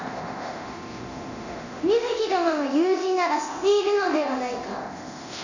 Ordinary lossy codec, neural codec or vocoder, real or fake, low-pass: none; codec, 24 kHz, 0.9 kbps, DualCodec; fake; 7.2 kHz